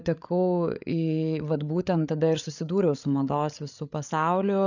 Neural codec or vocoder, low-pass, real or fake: codec, 16 kHz, 8 kbps, FreqCodec, larger model; 7.2 kHz; fake